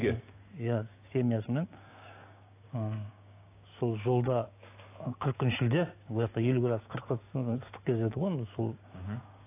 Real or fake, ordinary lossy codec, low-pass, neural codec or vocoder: fake; AAC, 24 kbps; 3.6 kHz; codec, 16 kHz, 6 kbps, DAC